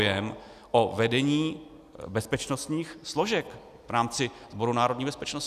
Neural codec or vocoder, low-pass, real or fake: none; 14.4 kHz; real